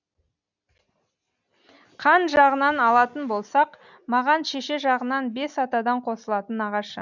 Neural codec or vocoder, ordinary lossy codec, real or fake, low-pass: none; none; real; 7.2 kHz